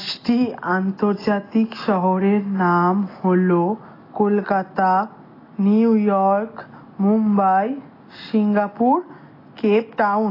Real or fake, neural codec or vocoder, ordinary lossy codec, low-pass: real; none; AAC, 24 kbps; 5.4 kHz